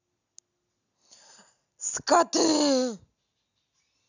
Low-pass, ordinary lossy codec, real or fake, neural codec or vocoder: 7.2 kHz; none; real; none